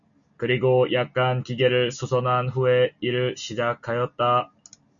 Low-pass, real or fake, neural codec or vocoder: 7.2 kHz; real; none